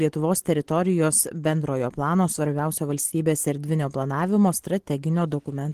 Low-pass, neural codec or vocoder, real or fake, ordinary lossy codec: 14.4 kHz; none; real; Opus, 16 kbps